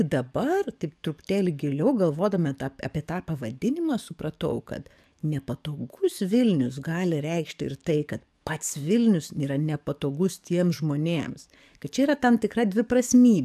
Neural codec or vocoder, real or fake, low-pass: codec, 44.1 kHz, 7.8 kbps, DAC; fake; 14.4 kHz